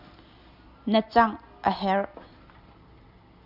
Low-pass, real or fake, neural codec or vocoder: 5.4 kHz; real; none